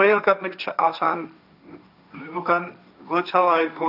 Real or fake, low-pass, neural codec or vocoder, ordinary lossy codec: fake; 5.4 kHz; codec, 16 kHz, 1.1 kbps, Voila-Tokenizer; none